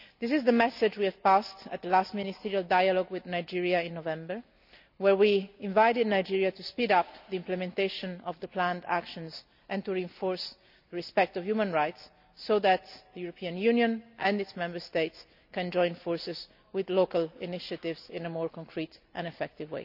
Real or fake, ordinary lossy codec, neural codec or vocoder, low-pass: real; none; none; 5.4 kHz